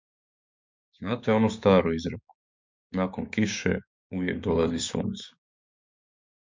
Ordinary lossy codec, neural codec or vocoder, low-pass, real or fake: MP3, 64 kbps; codec, 16 kHz, 6 kbps, DAC; 7.2 kHz; fake